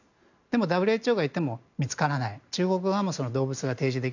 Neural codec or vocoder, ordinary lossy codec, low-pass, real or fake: none; none; 7.2 kHz; real